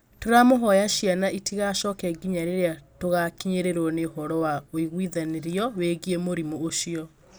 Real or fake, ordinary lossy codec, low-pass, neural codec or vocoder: real; none; none; none